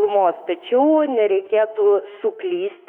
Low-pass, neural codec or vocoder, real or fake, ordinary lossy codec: 19.8 kHz; autoencoder, 48 kHz, 32 numbers a frame, DAC-VAE, trained on Japanese speech; fake; MP3, 96 kbps